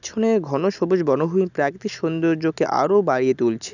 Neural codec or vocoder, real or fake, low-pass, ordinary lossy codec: none; real; 7.2 kHz; none